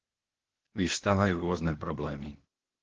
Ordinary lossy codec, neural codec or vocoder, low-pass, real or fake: Opus, 16 kbps; codec, 16 kHz, 0.8 kbps, ZipCodec; 7.2 kHz; fake